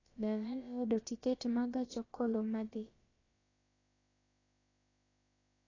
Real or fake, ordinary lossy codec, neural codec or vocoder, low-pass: fake; AAC, 32 kbps; codec, 16 kHz, about 1 kbps, DyCAST, with the encoder's durations; 7.2 kHz